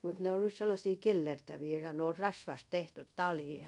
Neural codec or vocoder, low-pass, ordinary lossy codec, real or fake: codec, 24 kHz, 0.5 kbps, DualCodec; 10.8 kHz; none; fake